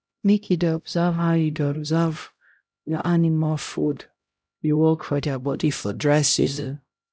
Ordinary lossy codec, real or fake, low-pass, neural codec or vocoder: none; fake; none; codec, 16 kHz, 0.5 kbps, X-Codec, HuBERT features, trained on LibriSpeech